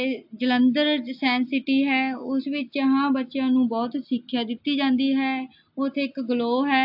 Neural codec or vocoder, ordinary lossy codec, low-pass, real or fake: none; AAC, 48 kbps; 5.4 kHz; real